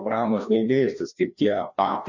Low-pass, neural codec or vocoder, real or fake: 7.2 kHz; codec, 16 kHz, 1 kbps, FreqCodec, larger model; fake